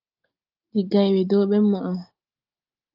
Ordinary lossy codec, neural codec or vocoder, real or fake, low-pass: Opus, 24 kbps; none; real; 5.4 kHz